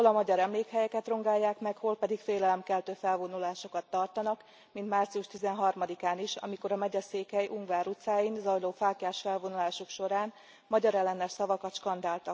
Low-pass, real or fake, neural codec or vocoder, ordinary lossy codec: none; real; none; none